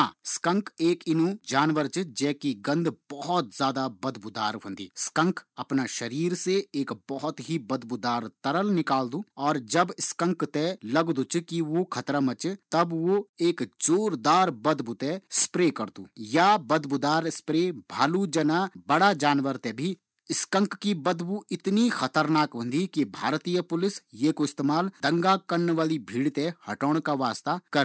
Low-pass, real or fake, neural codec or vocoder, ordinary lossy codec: none; real; none; none